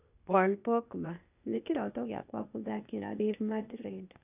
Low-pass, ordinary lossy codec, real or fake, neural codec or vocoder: 3.6 kHz; none; fake; codec, 16 kHz, 0.8 kbps, ZipCodec